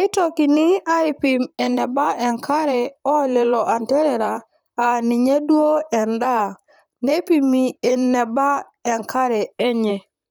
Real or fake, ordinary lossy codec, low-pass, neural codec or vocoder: fake; none; none; vocoder, 44.1 kHz, 128 mel bands, Pupu-Vocoder